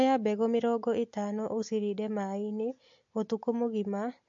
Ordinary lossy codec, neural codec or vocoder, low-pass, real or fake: MP3, 48 kbps; none; 7.2 kHz; real